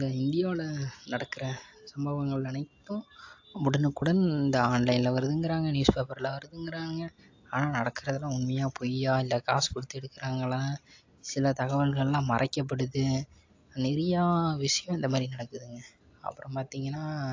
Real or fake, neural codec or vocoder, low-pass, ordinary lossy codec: real; none; 7.2 kHz; AAC, 48 kbps